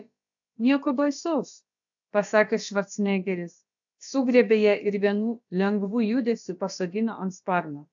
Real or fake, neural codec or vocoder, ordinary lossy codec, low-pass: fake; codec, 16 kHz, about 1 kbps, DyCAST, with the encoder's durations; AAC, 64 kbps; 7.2 kHz